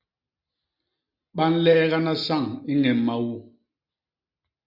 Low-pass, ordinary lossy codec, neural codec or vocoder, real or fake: 5.4 kHz; Opus, 64 kbps; none; real